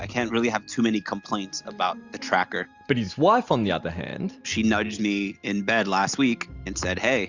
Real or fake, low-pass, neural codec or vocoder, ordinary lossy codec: real; 7.2 kHz; none; Opus, 64 kbps